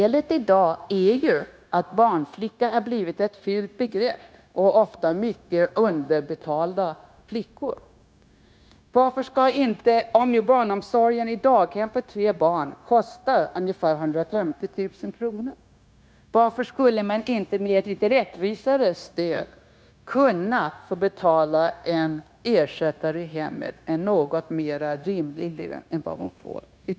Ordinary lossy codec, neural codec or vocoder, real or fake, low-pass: none; codec, 16 kHz, 0.9 kbps, LongCat-Audio-Codec; fake; none